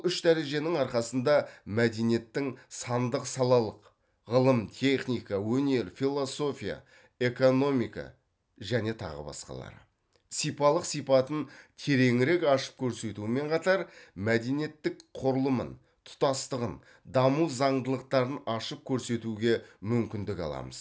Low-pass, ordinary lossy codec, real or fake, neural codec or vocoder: none; none; real; none